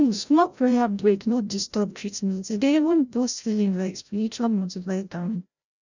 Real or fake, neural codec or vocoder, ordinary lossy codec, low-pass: fake; codec, 16 kHz, 0.5 kbps, FreqCodec, larger model; none; 7.2 kHz